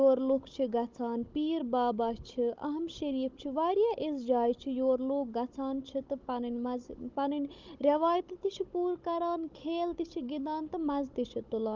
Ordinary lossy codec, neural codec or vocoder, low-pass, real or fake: Opus, 32 kbps; codec, 16 kHz, 16 kbps, FunCodec, trained on Chinese and English, 50 frames a second; 7.2 kHz; fake